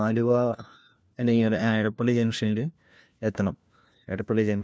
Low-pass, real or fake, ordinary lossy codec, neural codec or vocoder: none; fake; none; codec, 16 kHz, 1 kbps, FunCodec, trained on LibriTTS, 50 frames a second